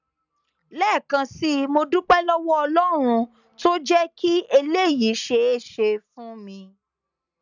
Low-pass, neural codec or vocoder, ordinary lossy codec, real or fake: 7.2 kHz; none; none; real